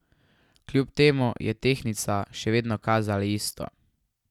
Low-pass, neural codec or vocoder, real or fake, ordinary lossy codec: 19.8 kHz; none; real; none